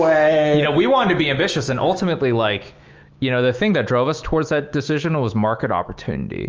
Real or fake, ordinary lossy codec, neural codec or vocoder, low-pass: fake; Opus, 24 kbps; autoencoder, 48 kHz, 128 numbers a frame, DAC-VAE, trained on Japanese speech; 7.2 kHz